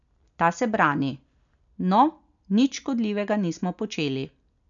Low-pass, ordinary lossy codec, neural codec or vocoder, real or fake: 7.2 kHz; none; none; real